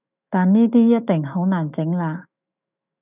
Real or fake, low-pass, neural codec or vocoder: fake; 3.6 kHz; autoencoder, 48 kHz, 128 numbers a frame, DAC-VAE, trained on Japanese speech